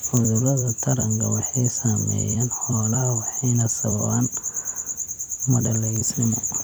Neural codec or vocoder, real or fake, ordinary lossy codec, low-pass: vocoder, 44.1 kHz, 128 mel bands every 256 samples, BigVGAN v2; fake; none; none